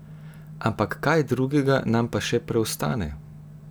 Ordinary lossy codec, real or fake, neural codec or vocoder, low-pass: none; real; none; none